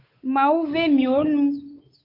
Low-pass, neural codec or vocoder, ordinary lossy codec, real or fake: 5.4 kHz; codec, 16 kHz, 8 kbps, FunCodec, trained on Chinese and English, 25 frames a second; AAC, 32 kbps; fake